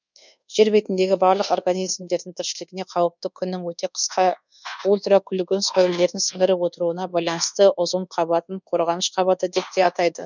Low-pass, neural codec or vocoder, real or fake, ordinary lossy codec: 7.2 kHz; codec, 24 kHz, 1.2 kbps, DualCodec; fake; none